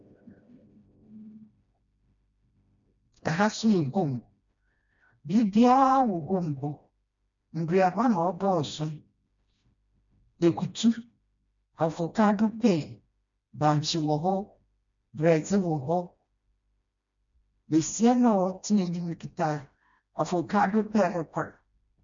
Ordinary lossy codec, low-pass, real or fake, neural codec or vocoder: MP3, 64 kbps; 7.2 kHz; fake; codec, 16 kHz, 1 kbps, FreqCodec, smaller model